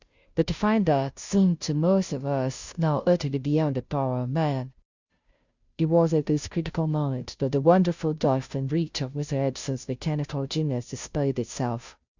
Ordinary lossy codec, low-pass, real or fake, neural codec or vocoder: Opus, 64 kbps; 7.2 kHz; fake; codec, 16 kHz, 0.5 kbps, FunCodec, trained on Chinese and English, 25 frames a second